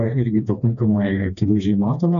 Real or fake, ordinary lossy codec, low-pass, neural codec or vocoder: fake; MP3, 64 kbps; 7.2 kHz; codec, 16 kHz, 2 kbps, FreqCodec, smaller model